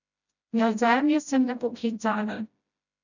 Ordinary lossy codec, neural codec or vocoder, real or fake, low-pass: none; codec, 16 kHz, 0.5 kbps, FreqCodec, smaller model; fake; 7.2 kHz